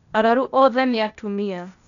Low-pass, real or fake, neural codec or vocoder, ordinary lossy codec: 7.2 kHz; fake; codec, 16 kHz, 0.8 kbps, ZipCodec; none